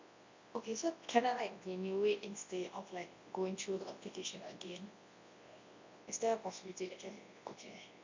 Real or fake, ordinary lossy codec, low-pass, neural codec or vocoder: fake; MP3, 48 kbps; 7.2 kHz; codec, 24 kHz, 0.9 kbps, WavTokenizer, large speech release